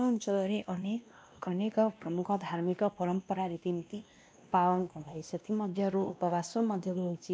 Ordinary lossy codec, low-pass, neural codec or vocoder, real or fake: none; none; codec, 16 kHz, 1 kbps, X-Codec, WavLM features, trained on Multilingual LibriSpeech; fake